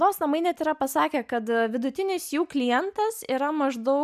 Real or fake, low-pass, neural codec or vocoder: real; 14.4 kHz; none